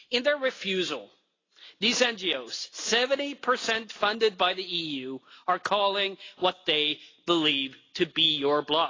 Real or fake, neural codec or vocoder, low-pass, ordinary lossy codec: real; none; 7.2 kHz; AAC, 32 kbps